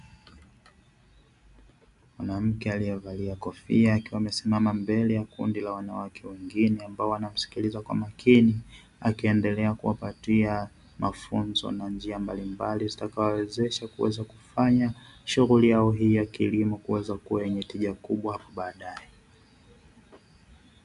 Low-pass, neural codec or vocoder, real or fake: 10.8 kHz; none; real